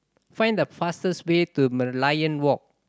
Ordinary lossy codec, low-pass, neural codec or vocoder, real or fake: none; none; none; real